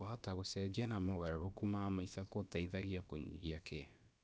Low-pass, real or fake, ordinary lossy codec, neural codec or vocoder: none; fake; none; codec, 16 kHz, about 1 kbps, DyCAST, with the encoder's durations